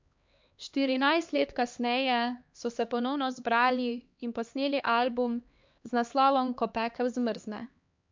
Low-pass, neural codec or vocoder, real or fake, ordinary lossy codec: 7.2 kHz; codec, 16 kHz, 2 kbps, X-Codec, HuBERT features, trained on LibriSpeech; fake; MP3, 64 kbps